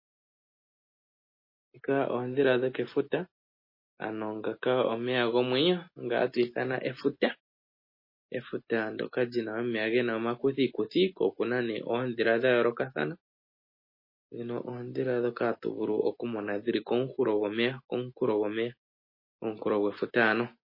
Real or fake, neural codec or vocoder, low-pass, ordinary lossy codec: real; none; 5.4 kHz; MP3, 24 kbps